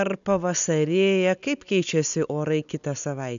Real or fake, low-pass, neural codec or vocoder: real; 7.2 kHz; none